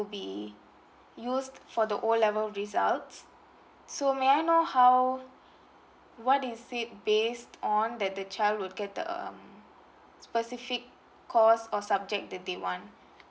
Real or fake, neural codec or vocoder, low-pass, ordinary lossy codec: real; none; none; none